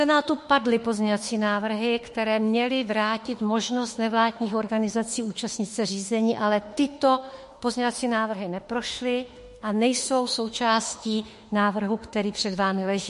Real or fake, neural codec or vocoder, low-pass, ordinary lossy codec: fake; autoencoder, 48 kHz, 32 numbers a frame, DAC-VAE, trained on Japanese speech; 14.4 kHz; MP3, 48 kbps